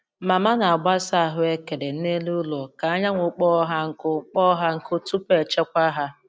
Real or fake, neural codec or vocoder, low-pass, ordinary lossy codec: real; none; none; none